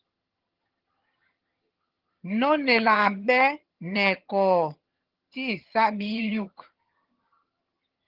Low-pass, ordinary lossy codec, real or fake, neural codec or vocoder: 5.4 kHz; Opus, 16 kbps; fake; vocoder, 22.05 kHz, 80 mel bands, HiFi-GAN